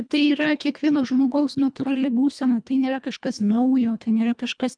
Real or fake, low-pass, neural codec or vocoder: fake; 9.9 kHz; codec, 24 kHz, 1.5 kbps, HILCodec